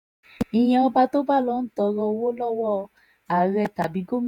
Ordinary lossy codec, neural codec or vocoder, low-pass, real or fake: none; vocoder, 48 kHz, 128 mel bands, Vocos; 19.8 kHz; fake